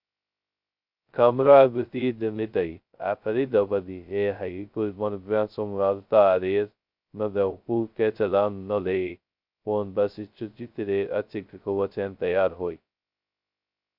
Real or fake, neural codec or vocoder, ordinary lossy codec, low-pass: fake; codec, 16 kHz, 0.2 kbps, FocalCodec; Opus, 64 kbps; 5.4 kHz